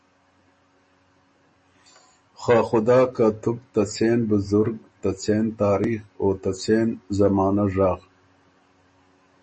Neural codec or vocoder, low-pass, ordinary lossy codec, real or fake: none; 9.9 kHz; MP3, 32 kbps; real